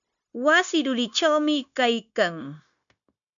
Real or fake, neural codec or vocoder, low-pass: fake; codec, 16 kHz, 0.9 kbps, LongCat-Audio-Codec; 7.2 kHz